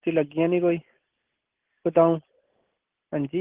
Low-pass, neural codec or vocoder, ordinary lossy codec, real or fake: 3.6 kHz; none; Opus, 16 kbps; real